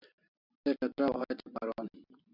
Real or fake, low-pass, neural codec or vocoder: real; 5.4 kHz; none